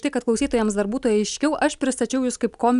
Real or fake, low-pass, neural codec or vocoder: real; 10.8 kHz; none